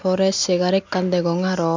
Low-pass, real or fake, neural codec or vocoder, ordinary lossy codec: 7.2 kHz; real; none; MP3, 64 kbps